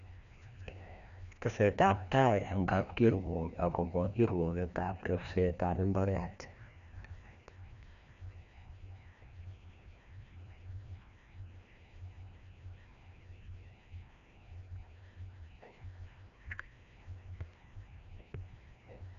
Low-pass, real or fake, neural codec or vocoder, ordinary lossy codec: 7.2 kHz; fake; codec, 16 kHz, 1 kbps, FreqCodec, larger model; none